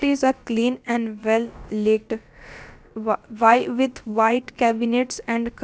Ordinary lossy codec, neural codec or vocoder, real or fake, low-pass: none; codec, 16 kHz, about 1 kbps, DyCAST, with the encoder's durations; fake; none